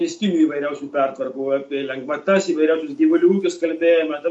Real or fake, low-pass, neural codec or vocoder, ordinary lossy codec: real; 10.8 kHz; none; MP3, 48 kbps